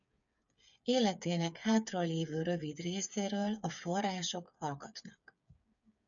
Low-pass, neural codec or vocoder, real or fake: 7.2 kHz; codec, 16 kHz, 8 kbps, FreqCodec, smaller model; fake